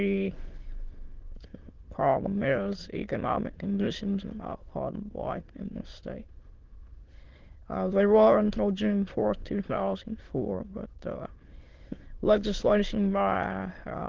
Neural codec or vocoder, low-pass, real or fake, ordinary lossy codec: autoencoder, 22.05 kHz, a latent of 192 numbers a frame, VITS, trained on many speakers; 7.2 kHz; fake; Opus, 16 kbps